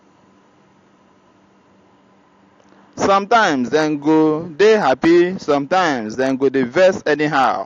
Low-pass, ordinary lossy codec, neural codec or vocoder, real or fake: 7.2 kHz; AAC, 48 kbps; none; real